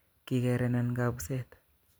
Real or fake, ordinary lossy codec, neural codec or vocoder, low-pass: real; none; none; none